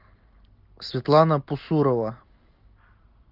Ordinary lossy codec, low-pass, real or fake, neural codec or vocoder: Opus, 32 kbps; 5.4 kHz; real; none